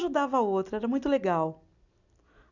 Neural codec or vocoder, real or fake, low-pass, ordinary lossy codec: none; real; 7.2 kHz; none